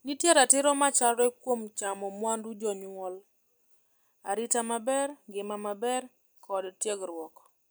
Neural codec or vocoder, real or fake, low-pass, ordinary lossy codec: none; real; none; none